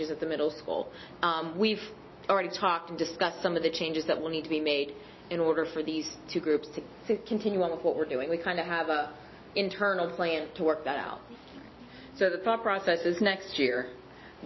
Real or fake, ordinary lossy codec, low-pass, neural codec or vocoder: real; MP3, 24 kbps; 7.2 kHz; none